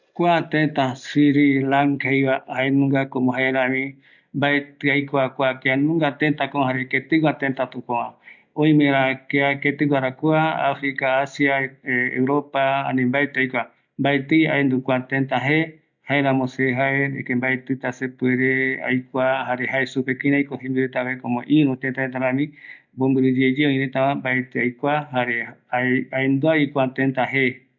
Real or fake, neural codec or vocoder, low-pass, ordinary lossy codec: real; none; 7.2 kHz; none